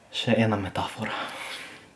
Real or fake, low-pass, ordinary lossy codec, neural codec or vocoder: real; none; none; none